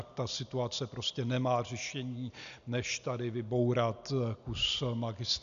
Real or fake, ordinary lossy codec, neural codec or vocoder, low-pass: real; AAC, 96 kbps; none; 7.2 kHz